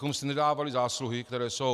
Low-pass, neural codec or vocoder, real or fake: 14.4 kHz; none; real